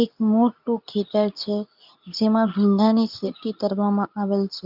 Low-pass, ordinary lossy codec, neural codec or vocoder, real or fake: 5.4 kHz; none; codec, 24 kHz, 0.9 kbps, WavTokenizer, medium speech release version 2; fake